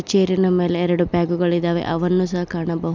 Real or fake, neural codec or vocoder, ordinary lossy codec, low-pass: real; none; none; 7.2 kHz